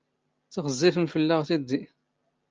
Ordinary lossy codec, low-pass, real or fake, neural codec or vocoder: Opus, 24 kbps; 7.2 kHz; real; none